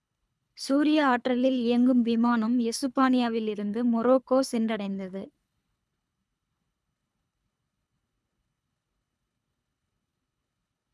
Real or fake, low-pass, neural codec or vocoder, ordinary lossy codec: fake; none; codec, 24 kHz, 3 kbps, HILCodec; none